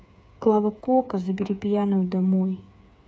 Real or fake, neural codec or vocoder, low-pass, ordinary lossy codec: fake; codec, 16 kHz, 8 kbps, FreqCodec, smaller model; none; none